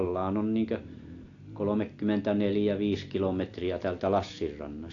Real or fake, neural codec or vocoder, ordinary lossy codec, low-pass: real; none; AAC, 48 kbps; 7.2 kHz